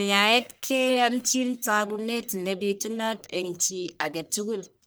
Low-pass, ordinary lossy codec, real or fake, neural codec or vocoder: none; none; fake; codec, 44.1 kHz, 1.7 kbps, Pupu-Codec